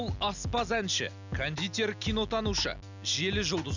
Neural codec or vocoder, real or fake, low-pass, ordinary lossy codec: none; real; 7.2 kHz; none